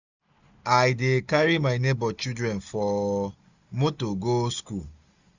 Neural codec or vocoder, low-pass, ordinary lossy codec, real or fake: none; 7.2 kHz; none; real